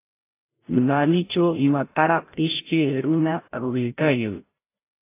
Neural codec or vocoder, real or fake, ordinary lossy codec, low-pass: codec, 16 kHz, 0.5 kbps, FreqCodec, larger model; fake; AAC, 24 kbps; 3.6 kHz